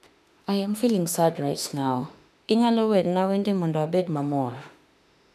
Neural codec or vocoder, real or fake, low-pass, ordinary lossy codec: autoencoder, 48 kHz, 32 numbers a frame, DAC-VAE, trained on Japanese speech; fake; 14.4 kHz; none